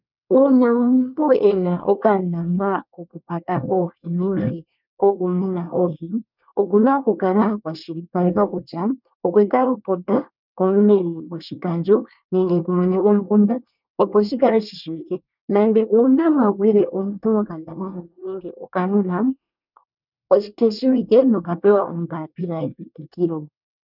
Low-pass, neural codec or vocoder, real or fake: 5.4 kHz; codec, 24 kHz, 1 kbps, SNAC; fake